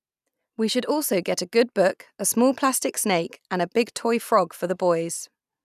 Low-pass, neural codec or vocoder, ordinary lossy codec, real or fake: 14.4 kHz; none; none; real